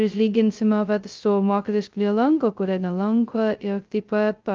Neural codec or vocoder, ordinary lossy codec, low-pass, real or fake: codec, 16 kHz, 0.2 kbps, FocalCodec; Opus, 24 kbps; 7.2 kHz; fake